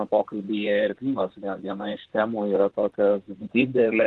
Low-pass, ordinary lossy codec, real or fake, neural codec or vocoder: 10.8 kHz; Opus, 16 kbps; fake; vocoder, 44.1 kHz, 128 mel bands, Pupu-Vocoder